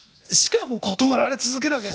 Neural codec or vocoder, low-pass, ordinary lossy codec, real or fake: codec, 16 kHz, 0.8 kbps, ZipCodec; none; none; fake